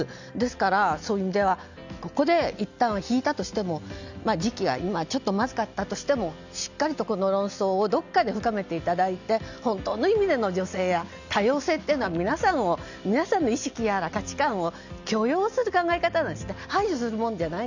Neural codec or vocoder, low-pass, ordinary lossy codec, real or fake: none; 7.2 kHz; none; real